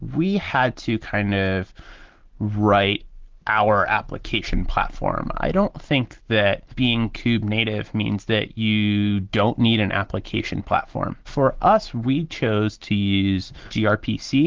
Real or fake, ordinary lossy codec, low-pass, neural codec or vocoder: real; Opus, 16 kbps; 7.2 kHz; none